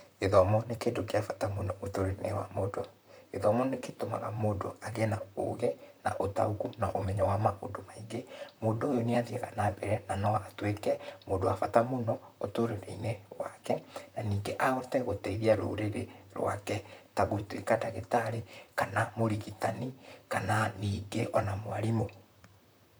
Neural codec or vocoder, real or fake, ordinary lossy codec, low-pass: vocoder, 44.1 kHz, 128 mel bands, Pupu-Vocoder; fake; none; none